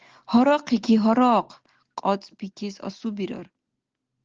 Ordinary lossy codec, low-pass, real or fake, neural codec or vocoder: Opus, 16 kbps; 7.2 kHz; real; none